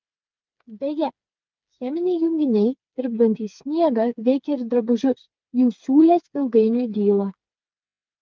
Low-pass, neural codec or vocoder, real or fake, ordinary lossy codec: 7.2 kHz; codec, 16 kHz, 4 kbps, FreqCodec, smaller model; fake; Opus, 32 kbps